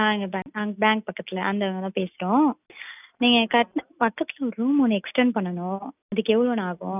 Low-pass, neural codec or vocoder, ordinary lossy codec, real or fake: 3.6 kHz; none; none; real